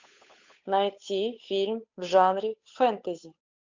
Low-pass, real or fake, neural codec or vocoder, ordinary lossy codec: 7.2 kHz; fake; codec, 16 kHz, 8 kbps, FunCodec, trained on Chinese and English, 25 frames a second; MP3, 64 kbps